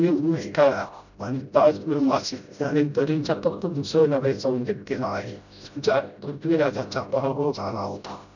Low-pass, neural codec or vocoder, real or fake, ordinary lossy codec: 7.2 kHz; codec, 16 kHz, 0.5 kbps, FreqCodec, smaller model; fake; none